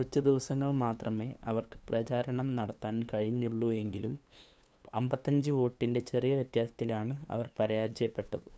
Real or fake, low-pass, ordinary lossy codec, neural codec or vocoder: fake; none; none; codec, 16 kHz, 2 kbps, FunCodec, trained on LibriTTS, 25 frames a second